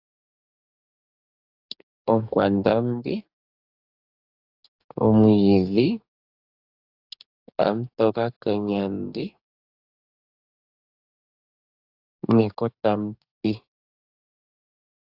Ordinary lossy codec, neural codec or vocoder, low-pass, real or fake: AAC, 48 kbps; codec, 44.1 kHz, 2.6 kbps, DAC; 5.4 kHz; fake